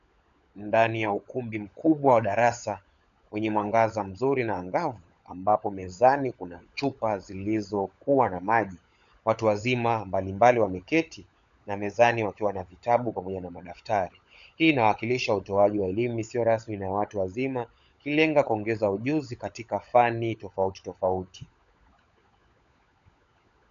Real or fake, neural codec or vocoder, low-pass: fake; codec, 16 kHz, 16 kbps, FunCodec, trained on LibriTTS, 50 frames a second; 7.2 kHz